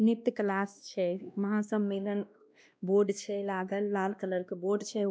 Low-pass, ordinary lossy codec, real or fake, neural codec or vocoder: none; none; fake; codec, 16 kHz, 1 kbps, X-Codec, WavLM features, trained on Multilingual LibriSpeech